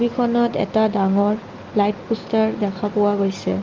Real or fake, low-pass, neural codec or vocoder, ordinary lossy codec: real; 7.2 kHz; none; Opus, 32 kbps